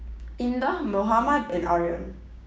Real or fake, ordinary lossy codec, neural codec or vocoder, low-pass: fake; none; codec, 16 kHz, 6 kbps, DAC; none